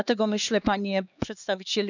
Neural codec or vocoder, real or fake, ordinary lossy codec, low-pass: codec, 16 kHz, 2 kbps, X-Codec, HuBERT features, trained on LibriSpeech; fake; none; 7.2 kHz